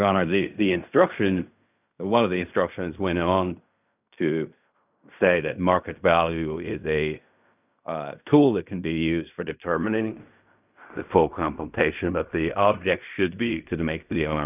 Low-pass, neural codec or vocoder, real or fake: 3.6 kHz; codec, 16 kHz in and 24 kHz out, 0.4 kbps, LongCat-Audio-Codec, fine tuned four codebook decoder; fake